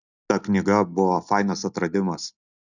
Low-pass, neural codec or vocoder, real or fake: 7.2 kHz; none; real